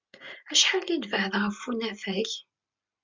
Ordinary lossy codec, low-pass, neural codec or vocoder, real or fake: MP3, 64 kbps; 7.2 kHz; none; real